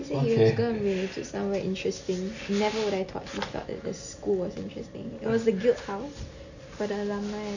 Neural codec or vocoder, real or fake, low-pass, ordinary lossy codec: none; real; 7.2 kHz; none